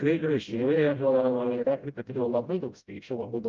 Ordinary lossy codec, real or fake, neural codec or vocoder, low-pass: Opus, 24 kbps; fake; codec, 16 kHz, 0.5 kbps, FreqCodec, smaller model; 7.2 kHz